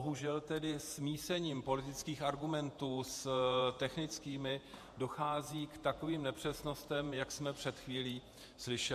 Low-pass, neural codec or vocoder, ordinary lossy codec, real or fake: 14.4 kHz; vocoder, 48 kHz, 128 mel bands, Vocos; MP3, 64 kbps; fake